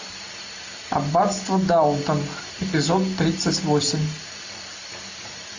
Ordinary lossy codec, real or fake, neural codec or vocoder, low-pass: AAC, 48 kbps; real; none; 7.2 kHz